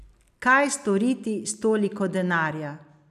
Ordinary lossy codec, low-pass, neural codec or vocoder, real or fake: none; 14.4 kHz; none; real